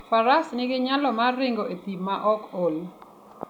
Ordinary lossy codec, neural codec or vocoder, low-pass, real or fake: none; none; 19.8 kHz; real